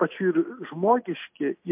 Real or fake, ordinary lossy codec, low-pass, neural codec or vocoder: real; MP3, 32 kbps; 3.6 kHz; none